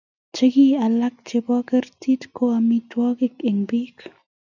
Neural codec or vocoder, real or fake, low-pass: none; real; 7.2 kHz